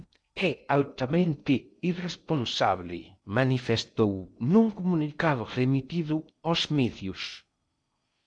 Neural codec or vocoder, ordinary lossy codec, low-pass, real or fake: codec, 16 kHz in and 24 kHz out, 0.6 kbps, FocalCodec, streaming, 2048 codes; MP3, 96 kbps; 9.9 kHz; fake